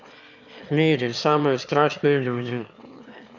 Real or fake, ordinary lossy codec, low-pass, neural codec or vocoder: fake; none; 7.2 kHz; autoencoder, 22.05 kHz, a latent of 192 numbers a frame, VITS, trained on one speaker